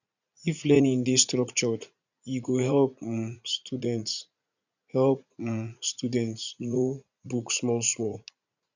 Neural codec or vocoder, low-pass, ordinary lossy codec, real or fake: vocoder, 44.1 kHz, 80 mel bands, Vocos; 7.2 kHz; none; fake